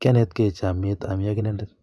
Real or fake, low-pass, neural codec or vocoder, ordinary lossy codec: fake; none; vocoder, 24 kHz, 100 mel bands, Vocos; none